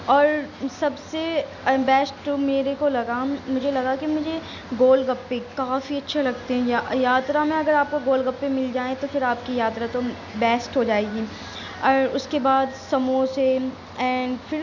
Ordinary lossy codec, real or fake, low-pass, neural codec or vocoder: none; real; 7.2 kHz; none